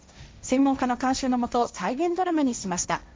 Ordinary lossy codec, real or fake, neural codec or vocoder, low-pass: none; fake; codec, 16 kHz, 1.1 kbps, Voila-Tokenizer; none